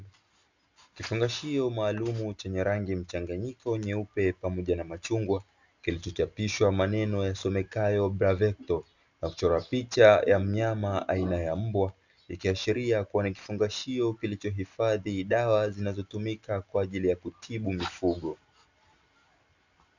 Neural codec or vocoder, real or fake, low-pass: none; real; 7.2 kHz